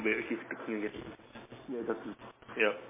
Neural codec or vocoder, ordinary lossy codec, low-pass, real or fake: none; MP3, 16 kbps; 3.6 kHz; real